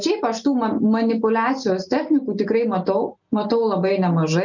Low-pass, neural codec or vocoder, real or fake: 7.2 kHz; none; real